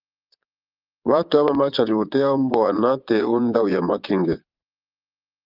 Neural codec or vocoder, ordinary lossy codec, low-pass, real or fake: vocoder, 22.05 kHz, 80 mel bands, Vocos; Opus, 32 kbps; 5.4 kHz; fake